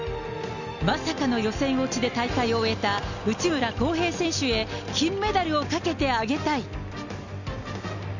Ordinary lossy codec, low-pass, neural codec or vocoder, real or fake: none; 7.2 kHz; none; real